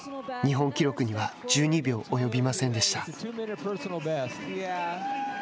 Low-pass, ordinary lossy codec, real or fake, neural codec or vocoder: none; none; real; none